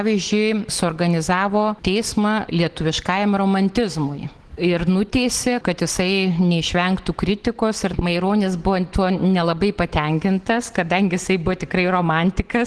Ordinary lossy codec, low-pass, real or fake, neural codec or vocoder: Opus, 16 kbps; 10.8 kHz; real; none